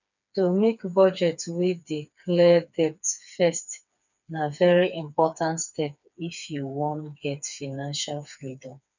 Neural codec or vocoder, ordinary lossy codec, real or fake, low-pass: codec, 16 kHz, 4 kbps, FreqCodec, smaller model; none; fake; 7.2 kHz